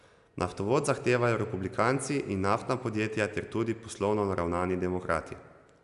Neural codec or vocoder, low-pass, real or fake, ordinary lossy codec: none; 10.8 kHz; real; AAC, 96 kbps